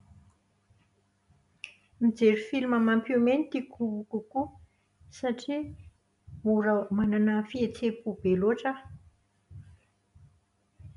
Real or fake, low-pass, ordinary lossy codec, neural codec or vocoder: real; 10.8 kHz; none; none